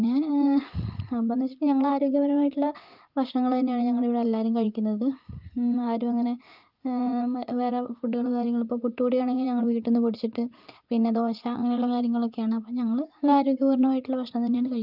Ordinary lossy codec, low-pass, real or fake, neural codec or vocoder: Opus, 24 kbps; 5.4 kHz; fake; vocoder, 44.1 kHz, 80 mel bands, Vocos